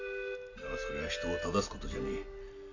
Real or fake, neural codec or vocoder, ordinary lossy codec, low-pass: real; none; AAC, 48 kbps; 7.2 kHz